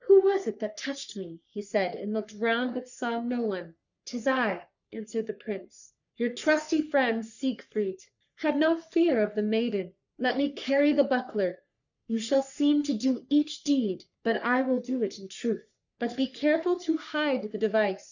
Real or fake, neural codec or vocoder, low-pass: fake; codec, 44.1 kHz, 3.4 kbps, Pupu-Codec; 7.2 kHz